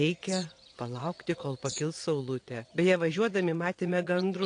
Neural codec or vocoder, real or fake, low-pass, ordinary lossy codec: vocoder, 22.05 kHz, 80 mel bands, WaveNeXt; fake; 9.9 kHz; AAC, 48 kbps